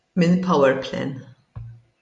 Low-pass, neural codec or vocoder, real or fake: 10.8 kHz; none; real